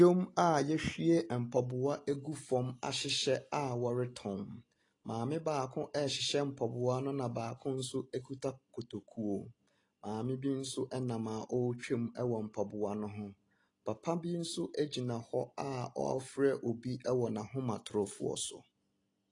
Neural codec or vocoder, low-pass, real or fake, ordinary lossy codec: none; 10.8 kHz; real; AAC, 32 kbps